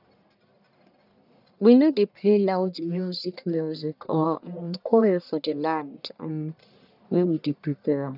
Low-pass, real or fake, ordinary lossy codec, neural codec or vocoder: 5.4 kHz; fake; none; codec, 44.1 kHz, 1.7 kbps, Pupu-Codec